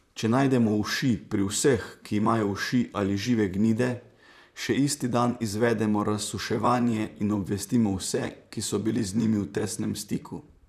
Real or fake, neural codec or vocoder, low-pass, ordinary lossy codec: fake; vocoder, 44.1 kHz, 128 mel bands, Pupu-Vocoder; 14.4 kHz; none